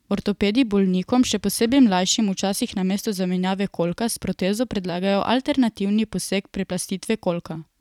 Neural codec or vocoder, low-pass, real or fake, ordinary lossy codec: vocoder, 44.1 kHz, 128 mel bands every 256 samples, BigVGAN v2; 19.8 kHz; fake; none